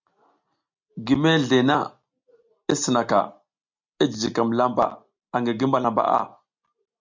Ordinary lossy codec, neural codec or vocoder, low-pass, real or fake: MP3, 64 kbps; none; 7.2 kHz; real